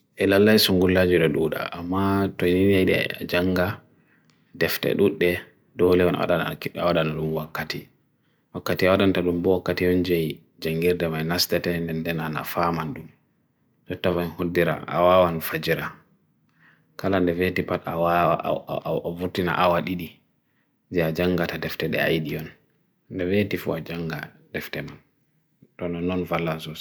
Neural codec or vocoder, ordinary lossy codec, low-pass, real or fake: none; none; none; real